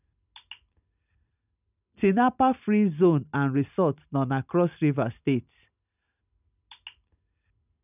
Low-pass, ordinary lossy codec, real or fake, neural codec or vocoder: 3.6 kHz; none; real; none